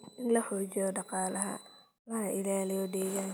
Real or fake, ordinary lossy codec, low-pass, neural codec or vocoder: real; none; none; none